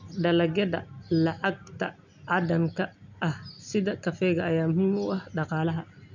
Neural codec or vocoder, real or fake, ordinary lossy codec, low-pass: none; real; none; 7.2 kHz